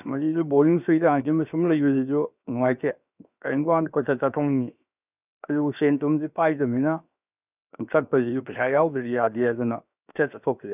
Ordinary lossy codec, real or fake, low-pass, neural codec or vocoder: none; fake; 3.6 kHz; codec, 16 kHz, 0.7 kbps, FocalCodec